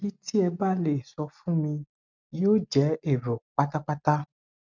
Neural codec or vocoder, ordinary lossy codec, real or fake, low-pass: none; none; real; 7.2 kHz